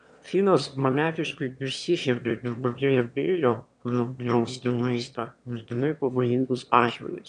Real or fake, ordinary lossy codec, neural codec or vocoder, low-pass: fake; MP3, 96 kbps; autoencoder, 22.05 kHz, a latent of 192 numbers a frame, VITS, trained on one speaker; 9.9 kHz